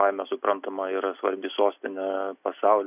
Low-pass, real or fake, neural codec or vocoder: 3.6 kHz; real; none